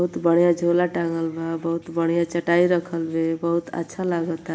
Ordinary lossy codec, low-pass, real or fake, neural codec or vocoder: none; none; real; none